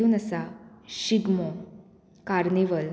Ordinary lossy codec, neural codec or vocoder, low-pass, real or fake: none; none; none; real